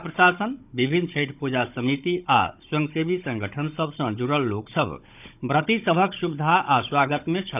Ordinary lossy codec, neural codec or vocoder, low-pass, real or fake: none; codec, 16 kHz, 16 kbps, FunCodec, trained on Chinese and English, 50 frames a second; 3.6 kHz; fake